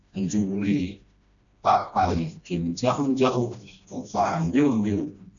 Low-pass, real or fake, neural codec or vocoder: 7.2 kHz; fake; codec, 16 kHz, 1 kbps, FreqCodec, smaller model